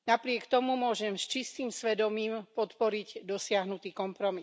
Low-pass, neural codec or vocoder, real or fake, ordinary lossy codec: none; none; real; none